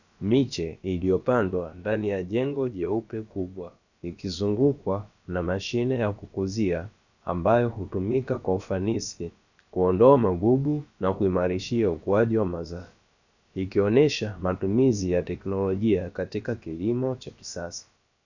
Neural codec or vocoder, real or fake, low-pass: codec, 16 kHz, about 1 kbps, DyCAST, with the encoder's durations; fake; 7.2 kHz